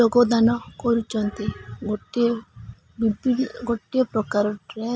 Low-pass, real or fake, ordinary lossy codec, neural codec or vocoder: none; real; none; none